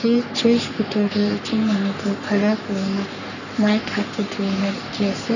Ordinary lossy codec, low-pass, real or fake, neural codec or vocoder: none; 7.2 kHz; fake; codec, 44.1 kHz, 3.4 kbps, Pupu-Codec